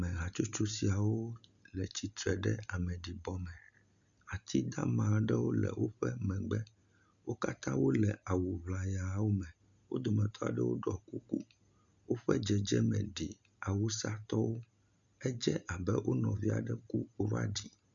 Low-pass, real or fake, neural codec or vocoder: 7.2 kHz; real; none